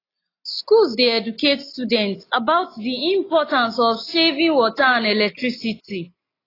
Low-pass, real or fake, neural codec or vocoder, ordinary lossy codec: 5.4 kHz; real; none; AAC, 24 kbps